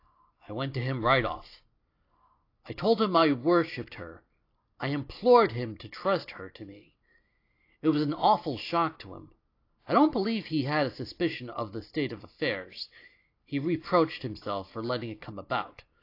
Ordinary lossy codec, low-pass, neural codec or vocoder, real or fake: AAC, 32 kbps; 5.4 kHz; none; real